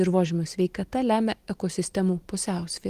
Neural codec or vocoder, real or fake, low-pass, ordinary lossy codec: none; real; 14.4 kHz; Opus, 24 kbps